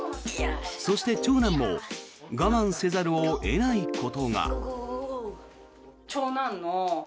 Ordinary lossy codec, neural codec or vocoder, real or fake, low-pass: none; none; real; none